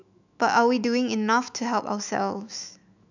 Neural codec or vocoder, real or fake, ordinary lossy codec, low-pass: autoencoder, 48 kHz, 128 numbers a frame, DAC-VAE, trained on Japanese speech; fake; none; 7.2 kHz